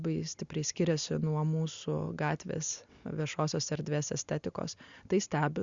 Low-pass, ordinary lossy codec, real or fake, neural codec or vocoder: 7.2 kHz; Opus, 64 kbps; real; none